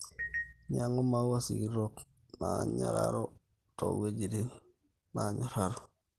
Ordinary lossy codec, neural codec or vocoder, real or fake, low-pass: Opus, 16 kbps; none; real; 14.4 kHz